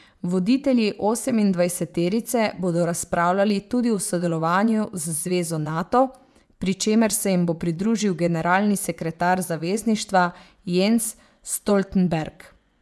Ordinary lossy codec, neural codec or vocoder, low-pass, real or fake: none; vocoder, 24 kHz, 100 mel bands, Vocos; none; fake